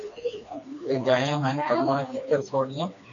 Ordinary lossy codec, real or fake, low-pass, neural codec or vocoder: MP3, 96 kbps; fake; 7.2 kHz; codec, 16 kHz, 2 kbps, FreqCodec, smaller model